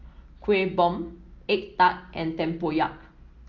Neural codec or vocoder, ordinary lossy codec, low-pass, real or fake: none; Opus, 24 kbps; 7.2 kHz; real